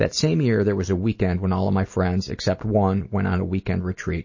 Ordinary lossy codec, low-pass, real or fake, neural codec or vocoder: MP3, 32 kbps; 7.2 kHz; real; none